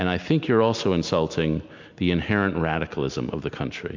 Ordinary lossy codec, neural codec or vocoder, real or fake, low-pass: MP3, 64 kbps; none; real; 7.2 kHz